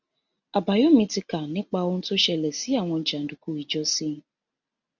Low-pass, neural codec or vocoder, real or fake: 7.2 kHz; none; real